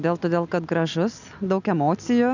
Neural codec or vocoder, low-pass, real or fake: none; 7.2 kHz; real